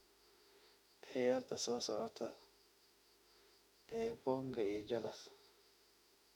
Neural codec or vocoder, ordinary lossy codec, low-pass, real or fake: autoencoder, 48 kHz, 32 numbers a frame, DAC-VAE, trained on Japanese speech; none; 19.8 kHz; fake